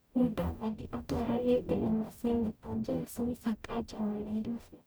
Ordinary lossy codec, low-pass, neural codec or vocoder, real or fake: none; none; codec, 44.1 kHz, 0.9 kbps, DAC; fake